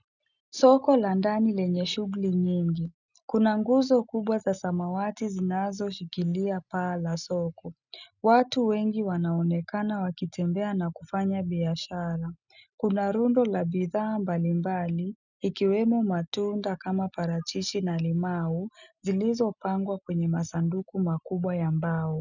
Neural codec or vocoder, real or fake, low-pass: none; real; 7.2 kHz